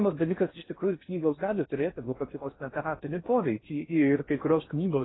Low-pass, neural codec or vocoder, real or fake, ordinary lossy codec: 7.2 kHz; codec, 16 kHz in and 24 kHz out, 0.6 kbps, FocalCodec, streaming, 4096 codes; fake; AAC, 16 kbps